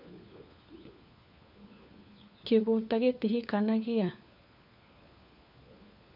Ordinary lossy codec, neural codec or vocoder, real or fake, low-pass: MP3, 48 kbps; codec, 16 kHz, 4 kbps, FunCodec, trained on LibriTTS, 50 frames a second; fake; 5.4 kHz